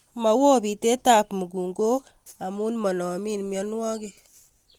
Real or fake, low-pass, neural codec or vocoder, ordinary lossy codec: real; 19.8 kHz; none; Opus, 24 kbps